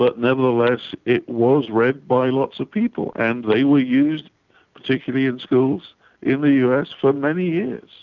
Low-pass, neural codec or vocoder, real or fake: 7.2 kHz; none; real